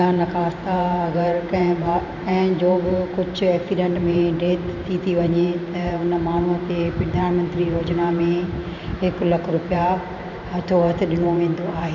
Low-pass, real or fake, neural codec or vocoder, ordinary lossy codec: 7.2 kHz; fake; vocoder, 44.1 kHz, 128 mel bands every 512 samples, BigVGAN v2; none